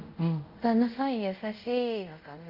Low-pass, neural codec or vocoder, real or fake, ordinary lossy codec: 5.4 kHz; codec, 24 kHz, 0.5 kbps, DualCodec; fake; Opus, 24 kbps